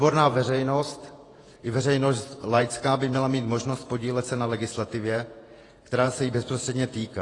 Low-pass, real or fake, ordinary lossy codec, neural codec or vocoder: 10.8 kHz; fake; AAC, 32 kbps; vocoder, 48 kHz, 128 mel bands, Vocos